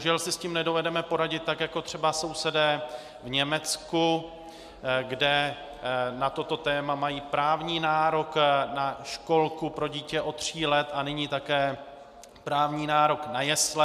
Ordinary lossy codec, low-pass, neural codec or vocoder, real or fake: MP3, 96 kbps; 14.4 kHz; none; real